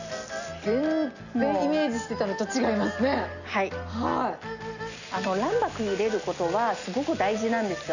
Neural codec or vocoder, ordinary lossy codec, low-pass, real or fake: none; none; 7.2 kHz; real